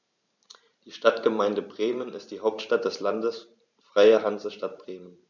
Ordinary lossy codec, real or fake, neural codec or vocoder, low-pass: none; fake; vocoder, 44.1 kHz, 128 mel bands every 512 samples, BigVGAN v2; 7.2 kHz